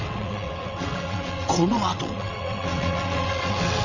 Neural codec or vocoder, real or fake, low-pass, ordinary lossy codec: vocoder, 22.05 kHz, 80 mel bands, WaveNeXt; fake; 7.2 kHz; none